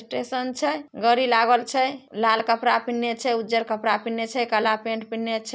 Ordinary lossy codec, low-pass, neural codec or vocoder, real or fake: none; none; none; real